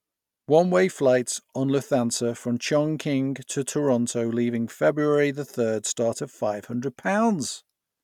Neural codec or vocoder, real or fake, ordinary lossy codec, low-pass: none; real; none; 19.8 kHz